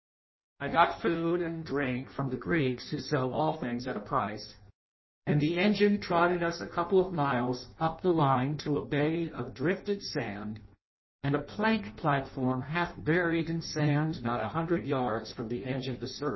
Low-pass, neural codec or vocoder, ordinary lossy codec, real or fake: 7.2 kHz; codec, 16 kHz in and 24 kHz out, 0.6 kbps, FireRedTTS-2 codec; MP3, 24 kbps; fake